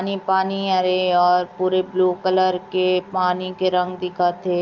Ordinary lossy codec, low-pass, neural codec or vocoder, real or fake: Opus, 24 kbps; 7.2 kHz; none; real